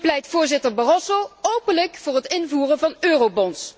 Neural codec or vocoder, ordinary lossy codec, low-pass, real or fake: none; none; none; real